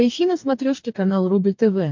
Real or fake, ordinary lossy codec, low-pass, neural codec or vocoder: fake; MP3, 64 kbps; 7.2 kHz; codec, 44.1 kHz, 2.6 kbps, DAC